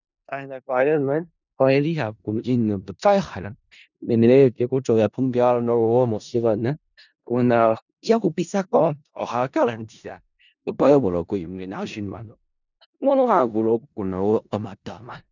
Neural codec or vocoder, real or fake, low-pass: codec, 16 kHz in and 24 kHz out, 0.4 kbps, LongCat-Audio-Codec, four codebook decoder; fake; 7.2 kHz